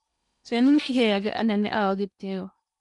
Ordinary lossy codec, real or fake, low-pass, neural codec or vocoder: none; fake; 10.8 kHz; codec, 16 kHz in and 24 kHz out, 0.8 kbps, FocalCodec, streaming, 65536 codes